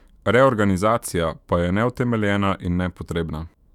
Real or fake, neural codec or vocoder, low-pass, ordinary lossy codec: fake; autoencoder, 48 kHz, 128 numbers a frame, DAC-VAE, trained on Japanese speech; 19.8 kHz; none